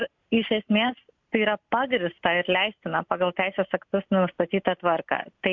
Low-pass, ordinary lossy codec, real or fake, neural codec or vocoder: 7.2 kHz; MP3, 64 kbps; real; none